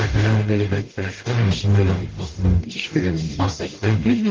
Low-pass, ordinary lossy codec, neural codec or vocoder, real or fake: 7.2 kHz; Opus, 16 kbps; codec, 44.1 kHz, 0.9 kbps, DAC; fake